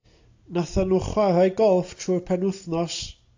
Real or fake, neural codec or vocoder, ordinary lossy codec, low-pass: real; none; AAC, 48 kbps; 7.2 kHz